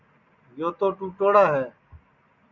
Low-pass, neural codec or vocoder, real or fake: 7.2 kHz; none; real